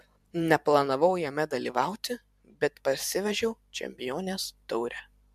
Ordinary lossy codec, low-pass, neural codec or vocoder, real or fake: MP3, 96 kbps; 14.4 kHz; vocoder, 44.1 kHz, 128 mel bands every 512 samples, BigVGAN v2; fake